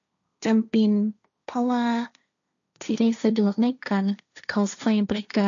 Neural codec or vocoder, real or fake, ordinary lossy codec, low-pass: codec, 16 kHz, 1.1 kbps, Voila-Tokenizer; fake; none; 7.2 kHz